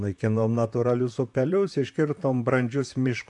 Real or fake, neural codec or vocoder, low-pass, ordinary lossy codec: fake; vocoder, 44.1 kHz, 128 mel bands, Pupu-Vocoder; 10.8 kHz; AAC, 64 kbps